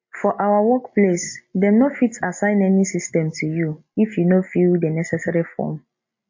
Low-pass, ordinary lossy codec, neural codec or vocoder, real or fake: 7.2 kHz; MP3, 32 kbps; none; real